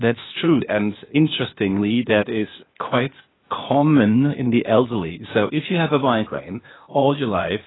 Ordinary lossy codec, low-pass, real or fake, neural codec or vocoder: AAC, 16 kbps; 7.2 kHz; fake; codec, 16 kHz, 0.8 kbps, ZipCodec